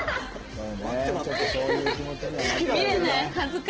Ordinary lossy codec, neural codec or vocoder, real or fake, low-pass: Opus, 16 kbps; none; real; 7.2 kHz